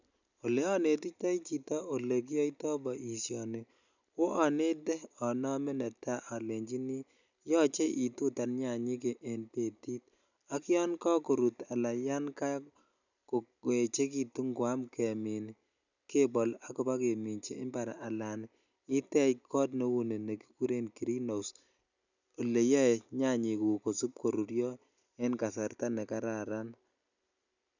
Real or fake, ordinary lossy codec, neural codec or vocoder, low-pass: real; none; none; 7.2 kHz